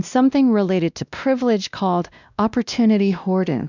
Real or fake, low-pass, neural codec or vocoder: fake; 7.2 kHz; codec, 16 kHz, 1 kbps, X-Codec, WavLM features, trained on Multilingual LibriSpeech